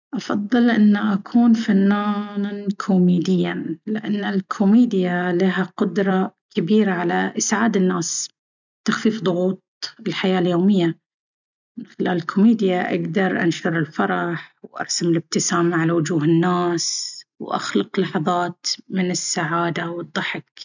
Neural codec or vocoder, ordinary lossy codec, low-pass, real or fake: none; none; 7.2 kHz; real